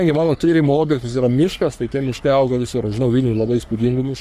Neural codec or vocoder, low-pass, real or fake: codec, 44.1 kHz, 3.4 kbps, Pupu-Codec; 14.4 kHz; fake